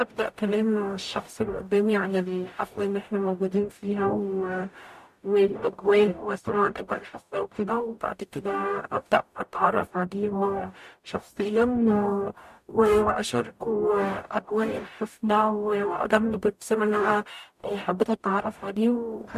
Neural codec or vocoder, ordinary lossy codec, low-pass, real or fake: codec, 44.1 kHz, 0.9 kbps, DAC; MP3, 64 kbps; 14.4 kHz; fake